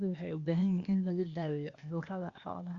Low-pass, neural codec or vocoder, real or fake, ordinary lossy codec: 7.2 kHz; codec, 16 kHz, 0.8 kbps, ZipCodec; fake; Opus, 64 kbps